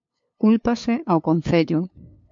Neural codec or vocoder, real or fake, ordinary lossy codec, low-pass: codec, 16 kHz, 2 kbps, FunCodec, trained on LibriTTS, 25 frames a second; fake; MP3, 48 kbps; 7.2 kHz